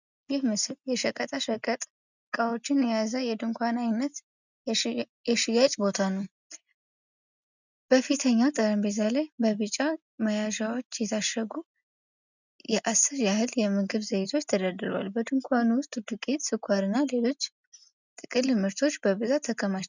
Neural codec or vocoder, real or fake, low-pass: none; real; 7.2 kHz